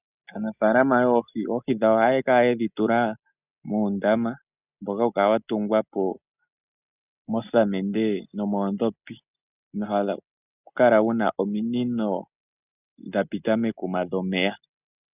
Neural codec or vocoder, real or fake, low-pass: none; real; 3.6 kHz